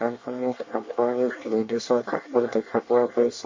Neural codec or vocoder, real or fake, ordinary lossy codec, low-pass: codec, 24 kHz, 1 kbps, SNAC; fake; MP3, 32 kbps; 7.2 kHz